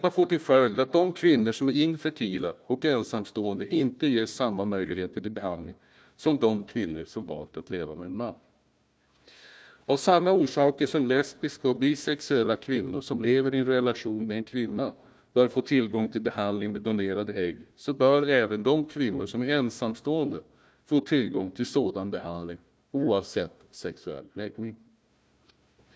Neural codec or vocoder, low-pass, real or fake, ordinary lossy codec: codec, 16 kHz, 1 kbps, FunCodec, trained on Chinese and English, 50 frames a second; none; fake; none